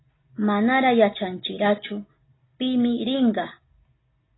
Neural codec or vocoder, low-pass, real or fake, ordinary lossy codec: none; 7.2 kHz; real; AAC, 16 kbps